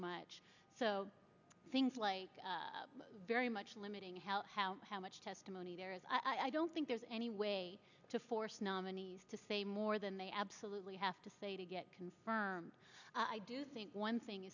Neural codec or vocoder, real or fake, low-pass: none; real; 7.2 kHz